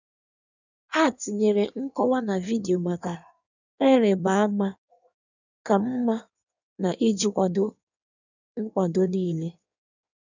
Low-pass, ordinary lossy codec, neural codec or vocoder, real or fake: 7.2 kHz; none; codec, 16 kHz in and 24 kHz out, 1.1 kbps, FireRedTTS-2 codec; fake